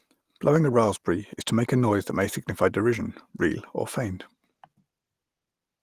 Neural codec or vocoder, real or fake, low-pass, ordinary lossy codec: none; real; 14.4 kHz; Opus, 24 kbps